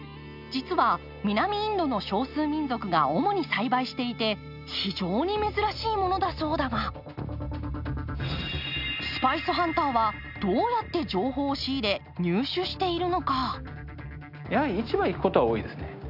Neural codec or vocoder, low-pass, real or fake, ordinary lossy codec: none; 5.4 kHz; real; none